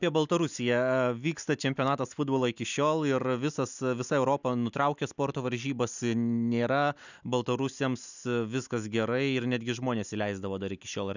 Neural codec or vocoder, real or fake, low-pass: none; real; 7.2 kHz